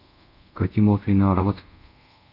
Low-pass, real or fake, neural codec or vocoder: 5.4 kHz; fake; codec, 24 kHz, 0.5 kbps, DualCodec